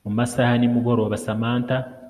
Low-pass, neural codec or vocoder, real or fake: 14.4 kHz; none; real